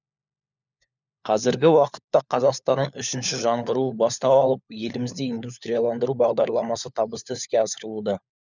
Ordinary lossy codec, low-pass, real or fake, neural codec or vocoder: none; 7.2 kHz; fake; codec, 16 kHz, 4 kbps, FunCodec, trained on LibriTTS, 50 frames a second